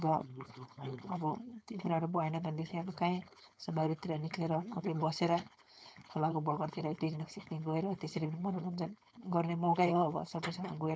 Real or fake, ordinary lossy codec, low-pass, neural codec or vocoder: fake; none; none; codec, 16 kHz, 4.8 kbps, FACodec